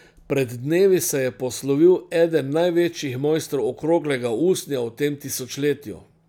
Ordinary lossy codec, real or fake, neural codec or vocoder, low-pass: none; real; none; 19.8 kHz